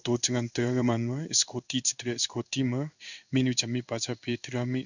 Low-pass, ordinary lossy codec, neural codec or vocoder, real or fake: 7.2 kHz; none; codec, 16 kHz in and 24 kHz out, 1 kbps, XY-Tokenizer; fake